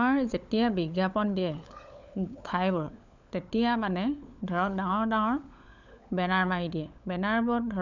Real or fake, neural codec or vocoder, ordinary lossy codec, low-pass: fake; codec, 16 kHz, 16 kbps, FunCodec, trained on LibriTTS, 50 frames a second; none; 7.2 kHz